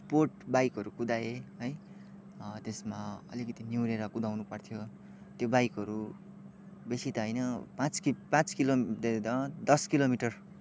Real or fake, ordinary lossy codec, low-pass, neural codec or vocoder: real; none; none; none